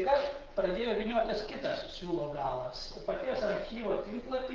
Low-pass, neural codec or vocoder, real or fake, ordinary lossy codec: 7.2 kHz; codec, 16 kHz, 8 kbps, FreqCodec, smaller model; fake; Opus, 32 kbps